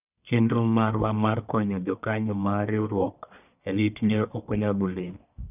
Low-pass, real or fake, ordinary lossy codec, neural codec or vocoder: 3.6 kHz; fake; none; codec, 44.1 kHz, 1.7 kbps, Pupu-Codec